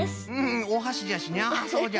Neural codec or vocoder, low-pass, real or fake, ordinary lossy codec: none; none; real; none